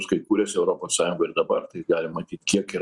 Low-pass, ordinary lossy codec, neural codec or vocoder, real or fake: 10.8 kHz; Opus, 64 kbps; none; real